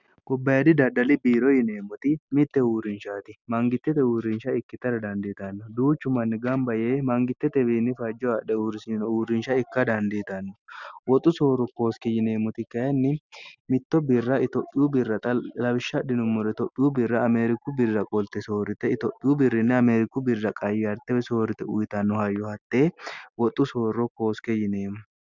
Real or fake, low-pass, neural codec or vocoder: real; 7.2 kHz; none